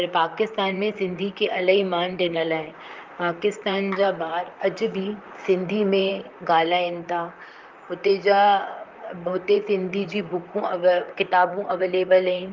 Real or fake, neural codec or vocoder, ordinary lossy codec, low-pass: fake; vocoder, 44.1 kHz, 128 mel bands, Pupu-Vocoder; Opus, 24 kbps; 7.2 kHz